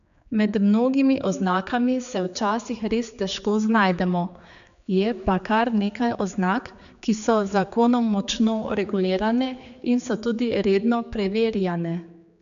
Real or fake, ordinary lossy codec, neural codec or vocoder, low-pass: fake; none; codec, 16 kHz, 4 kbps, X-Codec, HuBERT features, trained on general audio; 7.2 kHz